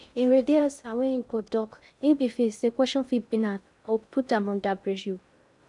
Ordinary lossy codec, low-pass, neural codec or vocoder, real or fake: none; 10.8 kHz; codec, 16 kHz in and 24 kHz out, 0.6 kbps, FocalCodec, streaming, 2048 codes; fake